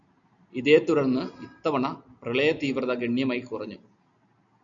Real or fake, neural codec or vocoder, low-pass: real; none; 7.2 kHz